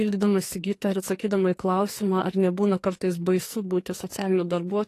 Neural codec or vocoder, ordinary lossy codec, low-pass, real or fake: codec, 44.1 kHz, 2.6 kbps, SNAC; AAC, 48 kbps; 14.4 kHz; fake